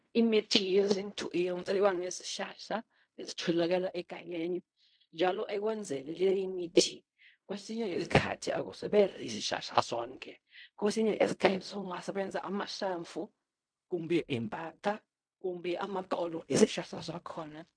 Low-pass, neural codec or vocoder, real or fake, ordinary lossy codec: 9.9 kHz; codec, 16 kHz in and 24 kHz out, 0.4 kbps, LongCat-Audio-Codec, fine tuned four codebook decoder; fake; MP3, 64 kbps